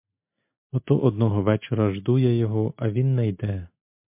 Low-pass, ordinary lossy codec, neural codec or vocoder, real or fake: 3.6 kHz; MP3, 32 kbps; none; real